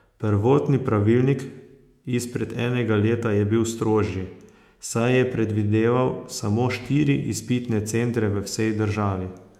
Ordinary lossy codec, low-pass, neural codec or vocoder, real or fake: MP3, 96 kbps; 19.8 kHz; autoencoder, 48 kHz, 128 numbers a frame, DAC-VAE, trained on Japanese speech; fake